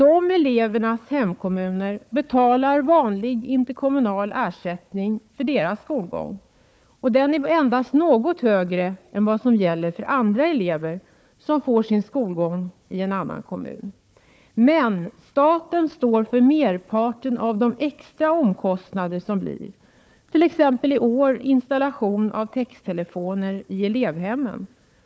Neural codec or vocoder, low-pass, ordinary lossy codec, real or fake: codec, 16 kHz, 4 kbps, FunCodec, trained on Chinese and English, 50 frames a second; none; none; fake